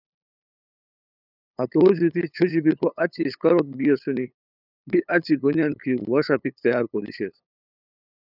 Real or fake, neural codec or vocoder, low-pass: fake; codec, 16 kHz, 8 kbps, FunCodec, trained on LibriTTS, 25 frames a second; 5.4 kHz